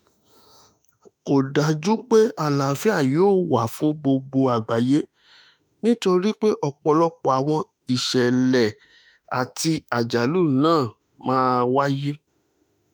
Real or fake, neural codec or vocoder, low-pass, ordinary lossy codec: fake; autoencoder, 48 kHz, 32 numbers a frame, DAC-VAE, trained on Japanese speech; none; none